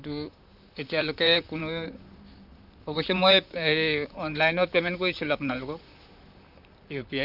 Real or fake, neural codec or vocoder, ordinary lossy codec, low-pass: fake; vocoder, 44.1 kHz, 128 mel bands, Pupu-Vocoder; none; 5.4 kHz